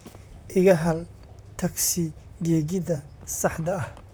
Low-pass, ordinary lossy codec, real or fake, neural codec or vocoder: none; none; fake; vocoder, 44.1 kHz, 128 mel bands, Pupu-Vocoder